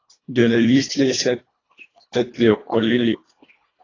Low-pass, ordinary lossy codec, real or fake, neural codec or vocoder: 7.2 kHz; AAC, 32 kbps; fake; codec, 24 kHz, 1.5 kbps, HILCodec